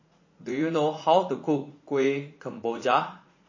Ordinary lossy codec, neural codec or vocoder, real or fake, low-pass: MP3, 32 kbps; vocoder, 44.1 kHz, 80 mel bands, Vocos; fake; 7.2 kHz